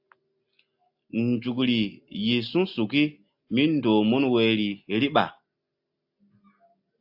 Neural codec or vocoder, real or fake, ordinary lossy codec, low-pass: none; real; AAC, 48 kbps; 5.4 kHz